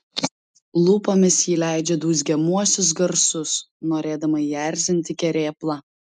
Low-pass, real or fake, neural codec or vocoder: 10.8 kHz; real; none